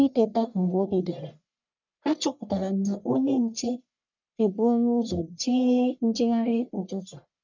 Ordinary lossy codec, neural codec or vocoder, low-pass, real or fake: none; codec, 44.1 kHz, 1.7 kbps, Pupu-Codec; 7.2 kHz; fake